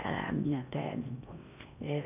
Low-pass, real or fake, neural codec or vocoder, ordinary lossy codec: 3.6 kHz; fake; codec, 16 kHz in and 24 kHz out, 0.6 kbps, FocalCodec, streaming, 4096 codes; none